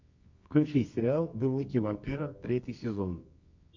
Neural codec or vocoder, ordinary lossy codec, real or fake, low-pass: codec, 24 kHz, 0.9 kbps, WavTokenizer, medium music audio release; MP3, 48 kbps; fake; 7.2 kHz